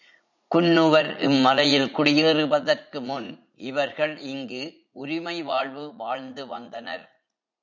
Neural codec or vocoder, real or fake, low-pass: vocoder, 44.1 kHz, 80 mel bands, Vocos; fake; 7.2 kHz